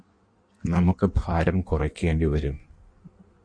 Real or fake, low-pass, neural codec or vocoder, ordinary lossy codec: fake; 9.9 kHz; codec, 16 kHz in and 24 kHz out, 1.1 kbps, FireRedTTS-2 codec; MP3, 48 kbps